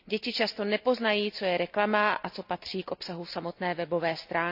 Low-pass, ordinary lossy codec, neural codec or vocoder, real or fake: 5.4 kHz; AAC, 48 kbps; none; real